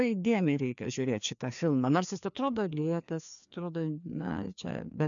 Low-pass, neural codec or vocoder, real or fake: 7.2 kHz; codec, 16 kHz, 2 kbps, FreqCodec, larger model; fake